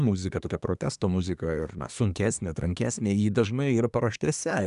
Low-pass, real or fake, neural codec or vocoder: 10.8 kHz; fake; codec, 24 kHz, 1 kbps, SNAC